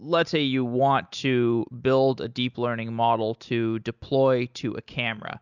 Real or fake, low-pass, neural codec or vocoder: real; 7.2 kHz; none